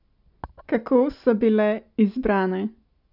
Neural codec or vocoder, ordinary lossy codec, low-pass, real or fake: none; none; 5.4 kHz; real